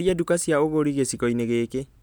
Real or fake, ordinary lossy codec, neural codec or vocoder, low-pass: real; none; none; none